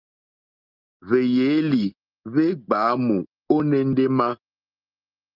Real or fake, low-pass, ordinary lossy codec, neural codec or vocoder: real; 5.4 kHz; Opus, 24 kbps; none